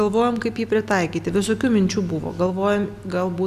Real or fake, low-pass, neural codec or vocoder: real; 14.4 kHz; none